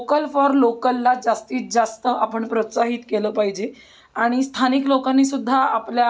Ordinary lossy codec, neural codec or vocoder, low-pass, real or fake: none; none; none; real